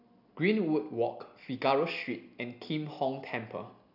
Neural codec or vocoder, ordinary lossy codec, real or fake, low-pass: none; none; real; 5.4 kHz